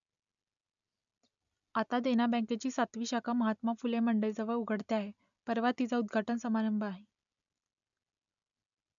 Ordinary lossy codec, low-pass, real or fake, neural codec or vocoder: none; 7.2 kHz; real; none